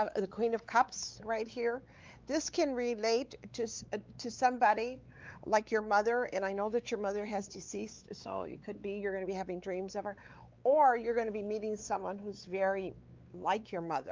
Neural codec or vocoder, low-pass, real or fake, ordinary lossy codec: codec, 16 kHz, 4 kbps, X-Codec, WavLM features, trained on Multilingual LibriSpeech; 7.2 kHz; fake; Opus, 24 kbps